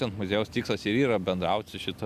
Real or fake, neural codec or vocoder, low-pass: real; none; 14.4 kHz